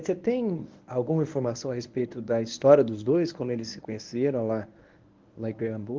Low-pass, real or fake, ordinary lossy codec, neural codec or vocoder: 7.2 kHz; fake; Opus, 32 kbps; codec, 24 kHz, 0.9 kbps, WavTokenizer, medium speech release version 1